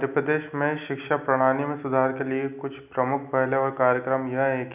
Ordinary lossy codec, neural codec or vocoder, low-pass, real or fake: none; none; 3.6 kHz; real